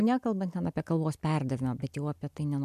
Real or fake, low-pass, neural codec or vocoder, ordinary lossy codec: real; 14.4 kHz; none; MP3, 96 kbps